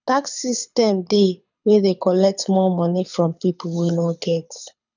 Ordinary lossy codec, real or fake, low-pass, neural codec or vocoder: none; fake; 7.2 kHz; codec, 24 kHz, 6 kbps, HILCodec